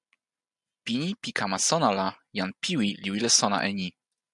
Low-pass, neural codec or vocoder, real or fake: 10.8 kHz; none; real